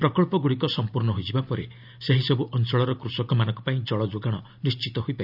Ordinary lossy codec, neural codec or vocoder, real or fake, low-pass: none; none; real; 5.4 kHz